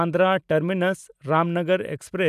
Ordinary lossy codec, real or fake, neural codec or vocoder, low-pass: none; real; none; 14.4 kHz